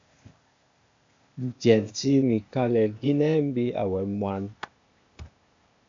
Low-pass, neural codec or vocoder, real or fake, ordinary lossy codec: 7.2 kHz; codec, 16 kHz, 0.8 kbps, ZipCodec; fake; AAC, 64 kbps